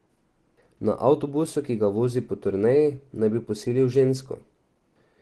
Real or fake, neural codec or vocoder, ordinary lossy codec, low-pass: real; none; Opus, 16 kbps; 10.8 kHz